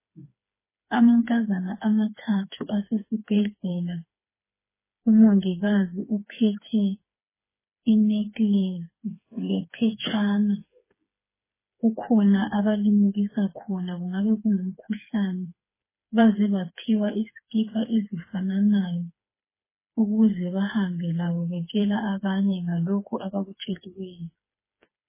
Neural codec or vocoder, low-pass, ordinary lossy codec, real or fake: codec, 16 kHz, 4 kbps, FreqCodec, smaller model; 3.6 kHz; MP3, 16 kbps; fake